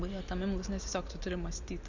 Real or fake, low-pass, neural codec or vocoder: real; 7.2 kHz; none